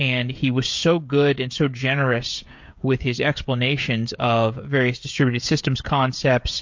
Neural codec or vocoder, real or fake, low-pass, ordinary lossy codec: codec, 16 kHz, 16 kbps, FreqCodec, smaller model; fake; 7.2 kHz; MP3, 48 kbps